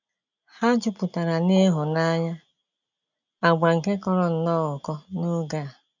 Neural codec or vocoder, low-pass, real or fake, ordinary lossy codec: none; 7.2 kHz; real; none